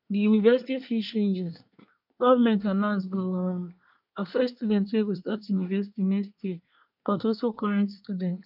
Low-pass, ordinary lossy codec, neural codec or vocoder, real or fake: 5.4 kHz; none; codec, 24 kHz, 1 kbps, SNAC; fake